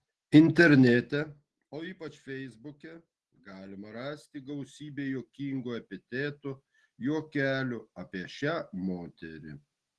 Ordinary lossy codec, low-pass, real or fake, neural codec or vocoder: Opus, 16 kbps; 10.8 kHz; real; none